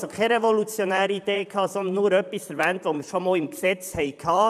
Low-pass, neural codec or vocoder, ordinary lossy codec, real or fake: 14.4 kHz; vocoder, 44.1 kHz, 128 mel bands, Pupu-Vocoder; none; fake